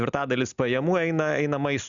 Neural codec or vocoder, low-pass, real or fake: none; 7.2 kHz; real